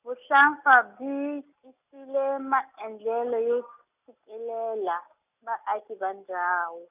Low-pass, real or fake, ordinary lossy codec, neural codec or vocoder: 3.6 kHz; real; none; none